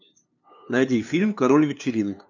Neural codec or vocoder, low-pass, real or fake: codec, 16 kHz, 2 kbps, FunCodec, trained on LibriTTS, 25 frames a second; 7.2 kHz; fake